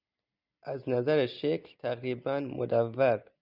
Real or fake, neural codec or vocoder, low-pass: real; none; 5.4 kHz